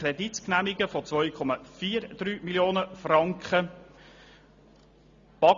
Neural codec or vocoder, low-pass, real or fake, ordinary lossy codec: none; 7.2 kHz; real; Opus, 64 kbps